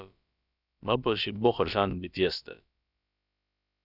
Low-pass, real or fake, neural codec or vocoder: 5.4 kHz; fake; codec, 16 kHz, about 1 kbps, DyCAST, with the encoder's durations